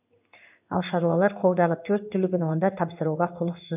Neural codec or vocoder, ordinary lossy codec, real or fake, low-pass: codec, 16 kHz in and 24 kHz out, 1 kbps, XY-Tokenizer; none; fake; 3.6 kHz